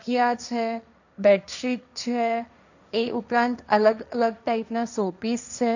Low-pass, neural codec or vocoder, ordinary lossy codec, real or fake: 7.2 kHz; codec, 16 kHz, 1.1 kbps, Voila-Tokenizer; none; fake